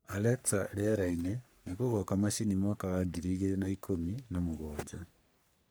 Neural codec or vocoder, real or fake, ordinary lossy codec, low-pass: codec, 44.1 kHz, 3.4 kbps, Pupu-Codec; fake; none; none